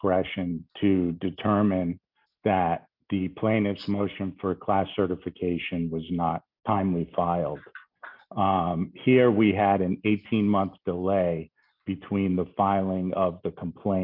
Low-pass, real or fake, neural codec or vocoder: 5.4 kHz; real; none